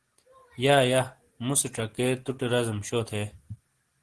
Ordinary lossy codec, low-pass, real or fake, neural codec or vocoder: Opus, 16 kbps; 10.8 kHz; real; none